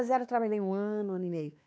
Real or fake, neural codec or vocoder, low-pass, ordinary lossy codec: fake; codec, 16 kHz, 2 kbps, X-Codec, WavLM features, trained on Multilingual LibriSpeech; none; none